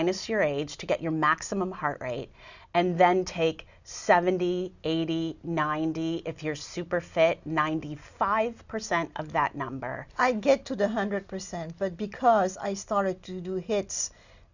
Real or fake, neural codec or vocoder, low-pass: real; none; 7.2 kHz